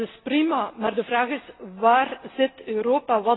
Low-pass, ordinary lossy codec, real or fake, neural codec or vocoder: 7.2 kHz; AAC, 16 kbps; real; none